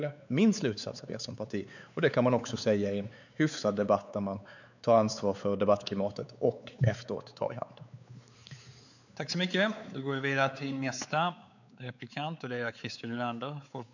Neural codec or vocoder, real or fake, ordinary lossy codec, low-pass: codec, 16 kHz, 4 kbps, X-Codec, WavLM features, trained on Multilingual LibriSpeech; fake; none; 7.2 kHz